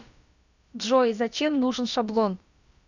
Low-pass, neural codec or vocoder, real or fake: 7.2 kHz; codec, 16 kHz, about 1 kbps, DyCAST, with the encoder's durations; fake